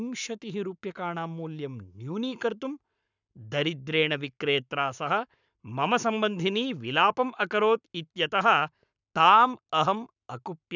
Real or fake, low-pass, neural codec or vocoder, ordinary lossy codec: fake; 7.2 kHz; codec, 44.1 kHz, 7.8 kbps, Pupu-Codec; none